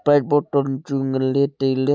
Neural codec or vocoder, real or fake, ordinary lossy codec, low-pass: none; real; none; none